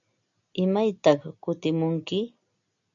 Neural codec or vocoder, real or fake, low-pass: none; real; 7.2 kHz